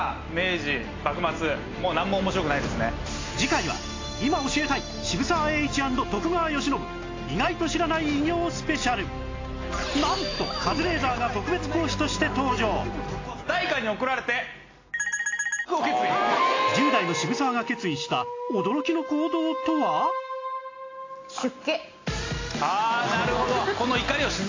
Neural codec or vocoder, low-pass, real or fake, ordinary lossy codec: none; 7.2 kHz; real; AAC, 32 kbps